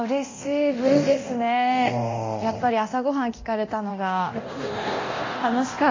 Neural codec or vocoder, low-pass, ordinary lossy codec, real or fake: codec, 24 kHz, 0.9 kbps, DualCodec; 7.2 kHz; MP3, 32 kbps; fake